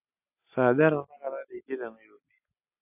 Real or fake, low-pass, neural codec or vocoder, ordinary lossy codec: real; 3.6 kHz; none; AAC, 24 kbps